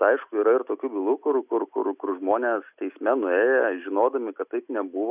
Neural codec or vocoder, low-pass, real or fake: none; 3.6 kHz; real